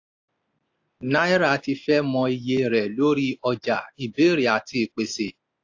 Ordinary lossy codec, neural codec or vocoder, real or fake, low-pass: AAC, 48 kbps; none; real; 7.2 kHz